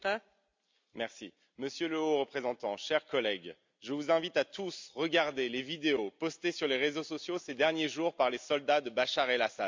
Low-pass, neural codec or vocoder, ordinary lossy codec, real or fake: 7.2 kHz; none; MP3, 64 kbps; real